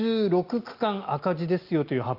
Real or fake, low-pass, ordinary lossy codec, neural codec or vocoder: real; 5.4 kHz; Opus, 32 kbps; none